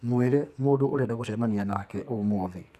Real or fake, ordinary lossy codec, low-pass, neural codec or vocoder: fake; none; 14.4 kHz; codec, 44.1 kHz, 2.6 kbps, SNAC